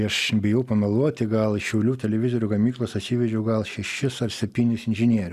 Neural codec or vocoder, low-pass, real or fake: none; 14.4 kHz; real